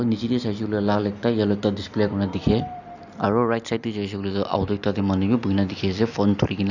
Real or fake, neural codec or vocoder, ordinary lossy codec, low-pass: real; none; none; 7.2 kHz